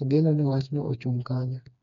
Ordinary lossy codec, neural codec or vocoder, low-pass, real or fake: none; codec, 16 kHz, 2 kbps, FreqCodec, smaller model; 7.2 kHz; fake